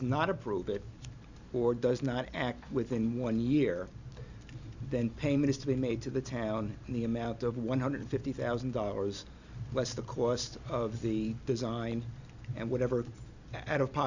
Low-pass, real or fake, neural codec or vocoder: 7.2 kHz; real; none